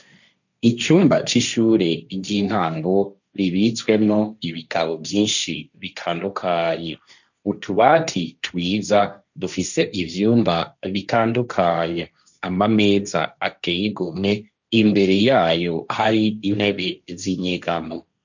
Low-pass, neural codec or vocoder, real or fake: 7.2 kHz; codec, 16 kHz, 1.1 kbps, Voila-Tokenizer; fake